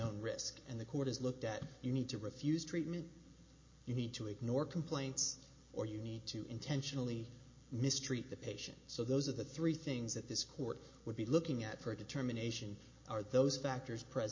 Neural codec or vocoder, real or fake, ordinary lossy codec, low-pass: none; real; MP3, 32 kbps; 7.2 kHz